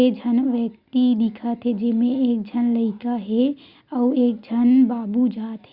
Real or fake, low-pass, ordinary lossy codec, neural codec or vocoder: real; 5.4 kHz; Opus, 64 kbps; none